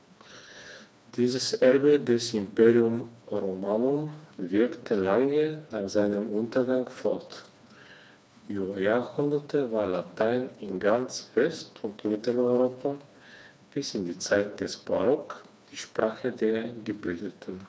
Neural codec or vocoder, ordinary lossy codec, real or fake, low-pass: codec, 16 kHz, 2 kbps, FreqCodec, smaller model; none; fake; none